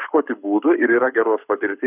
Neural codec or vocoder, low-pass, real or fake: codec, 44.1 kHz, 7.8 kbps, Pupu-Codec; 3.6 kHz; fake